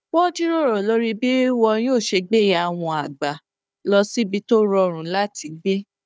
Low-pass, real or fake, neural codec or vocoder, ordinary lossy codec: none; fake; codec, 16 kHz, 4 kbps, FunCodec, trained on Chinese and English, 50 frames a second; none